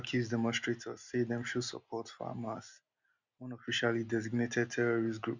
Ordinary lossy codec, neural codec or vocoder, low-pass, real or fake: Opus, 64 kbps; none; 7.2 kHz; real